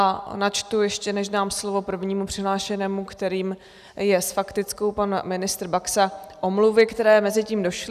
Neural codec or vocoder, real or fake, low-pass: none; real; 14.4 kHz